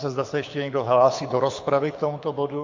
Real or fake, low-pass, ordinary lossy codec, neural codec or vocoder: fake; 7.2 kHz; MP3, 48 kbps; codec, 24 kHz, 6 kbps, HILCodec